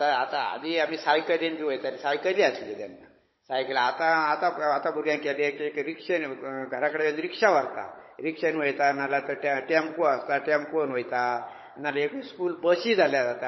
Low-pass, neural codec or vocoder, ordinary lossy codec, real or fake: 7.2 kHz; codec, 16 kHz, 16 kbps, FunCodec, trained on Chinese and English, 50 frames a second; MP3, 24 kbps; fake